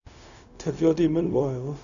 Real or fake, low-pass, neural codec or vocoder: fake; 7.2 kHz; codec, 16 kHz, 0.4 kbps, LongCat-Audio-Codec